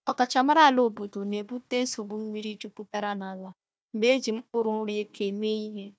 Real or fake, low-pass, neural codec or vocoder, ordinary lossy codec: fake; none; codec, 16 kHz, 1 kbps, FunCodec, trained on Chinese and English, 50 frames a second; none